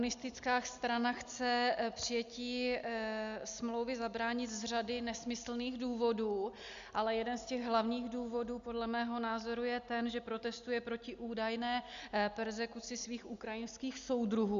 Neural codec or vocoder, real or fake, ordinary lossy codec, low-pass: none; real; Opus, 64 kbps; 7.2 kHz